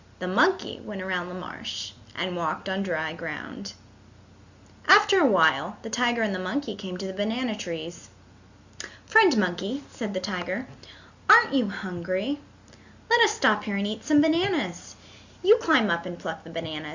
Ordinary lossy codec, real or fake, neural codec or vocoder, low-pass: Opus, 64 kbps; real; none; 7.2 kHz